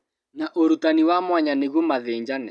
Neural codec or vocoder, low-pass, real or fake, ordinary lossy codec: none; none; real; none